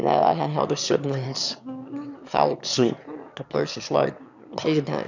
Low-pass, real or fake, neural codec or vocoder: 7.2 kHz; fake; autoencoder, 22.05 kHz, a latent of 192 numbers a frame, VITS, trained on one speaker